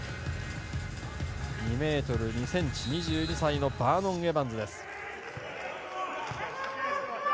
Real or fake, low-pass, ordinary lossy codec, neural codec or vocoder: real; none; none; none